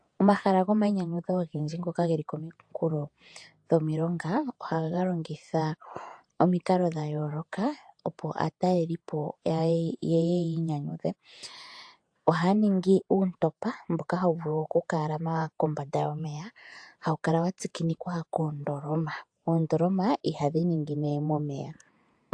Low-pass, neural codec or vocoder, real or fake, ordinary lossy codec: 9.9 kHz; vocoder, 48 kHz, 128 mel bands, Vocos; fake; AAC, 64 kbps